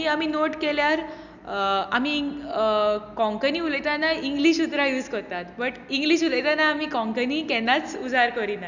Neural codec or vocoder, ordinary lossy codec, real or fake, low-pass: none; none; real; 7.2 kHz